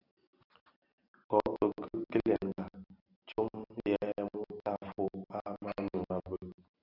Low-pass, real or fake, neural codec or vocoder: 5.4 kHz; real; none